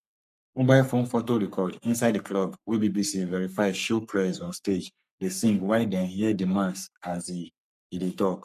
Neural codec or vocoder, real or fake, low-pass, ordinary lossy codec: codec, 44.1 kHz, 3.4 kbps, Pupu-Codec; fake; 14.4 kHz; none